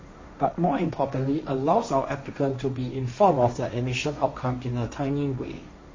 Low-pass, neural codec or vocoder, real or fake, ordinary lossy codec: 7.2 kHz; codec, 16 kHz, 1.1 kbps, Voila-Tokenizer; fake; MP3, 32 kbps